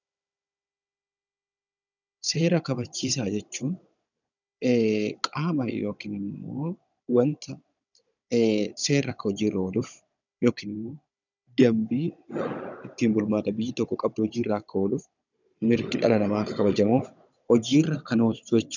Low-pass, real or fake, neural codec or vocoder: 7.2 kHz; fake; codec, 16 kHz, 16 kbps, FunCodec, trained on Chinese and English, 50 frames a second